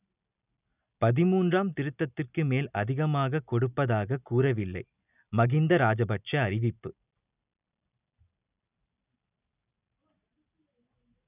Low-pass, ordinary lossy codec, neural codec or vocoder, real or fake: 3.6 kHz; none; none; real